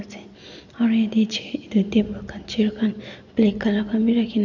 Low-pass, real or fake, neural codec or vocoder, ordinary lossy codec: 7.2 kHz; real; none; none